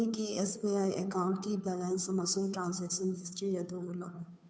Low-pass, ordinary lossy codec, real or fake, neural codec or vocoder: none; none; fake; codec, 16 kHz, 2 kbps, FunCodec, trained on Chinese and English, 25 frames a second